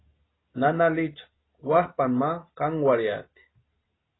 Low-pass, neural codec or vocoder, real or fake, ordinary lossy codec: 7.2 kHz; none; real; AAC, 16 kbps